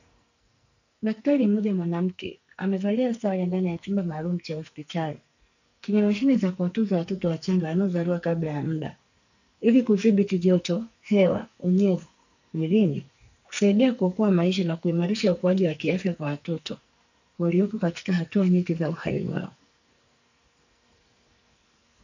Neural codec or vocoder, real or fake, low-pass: codec, 44.1 kHz, 2.6 kbps, SNAC; fake; 7.2 kHz